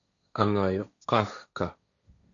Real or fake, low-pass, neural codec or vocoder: fake; 7.2 kHz; codec, 16 kHz, 1.1 kbps, Voila-Tokenizer